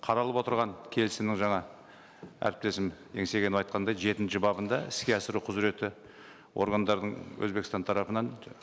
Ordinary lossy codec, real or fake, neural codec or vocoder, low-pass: none; real; none; none